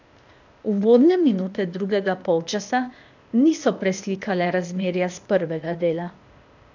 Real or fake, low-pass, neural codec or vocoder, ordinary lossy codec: fake; 7.2 kHz; codec, 16 kHz, 0.8 kbps, ZipCodec; none